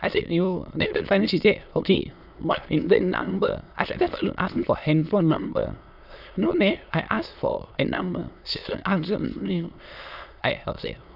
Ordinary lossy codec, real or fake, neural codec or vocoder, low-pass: none; fake; autoencoder, 22.05 kHz, a latent of 192 numbers a frame, VITS, trained on many speakers; 5.4 kHz